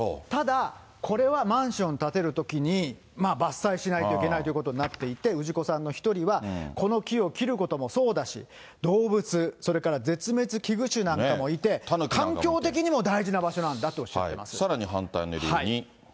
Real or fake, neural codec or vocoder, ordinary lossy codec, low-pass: real; none; none; none